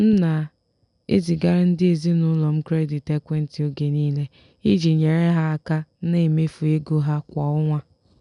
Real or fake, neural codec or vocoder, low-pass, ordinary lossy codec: real; none; 10.8 kHz; none